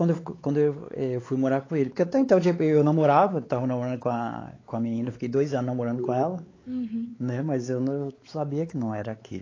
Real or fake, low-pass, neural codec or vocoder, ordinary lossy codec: fake; 7.2 kHz; codec, 16 kHz, 4 kbps, X-Codec, WavLM features, trained on Multilingual LibriSpeech; AAC, 32 kbps